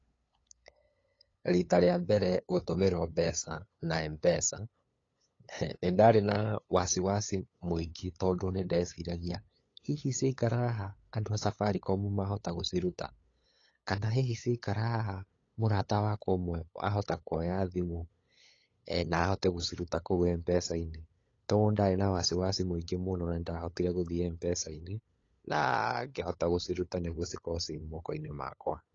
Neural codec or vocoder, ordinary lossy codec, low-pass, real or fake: codec, 16 kHz, 8 kbps, FunCodec, trained on LibriTTS, 25 frames a second; AAC, 32 kbps; 7.2 kHz; fake